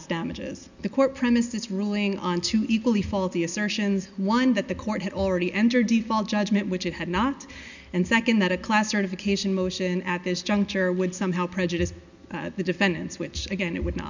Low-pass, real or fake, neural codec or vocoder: 7.2 kHz; real; none